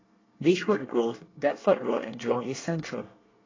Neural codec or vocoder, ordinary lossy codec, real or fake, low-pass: codec, 24 kHz, 1 kbps, SNAC; AAC, 32 kbps; fake; 7.2 kHz